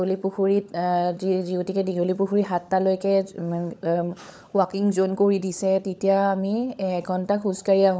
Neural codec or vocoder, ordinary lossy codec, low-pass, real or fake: codec, 16 kHz, 16 kbps, FunCodec, trained on LibriTTS, 50 frames a second; none; none; fake